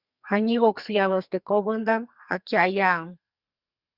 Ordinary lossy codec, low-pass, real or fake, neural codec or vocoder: Opus, 64 kbps; 5.4 kHz; fake; codec, 44.1 kHz, 2.6 kbps, SNAC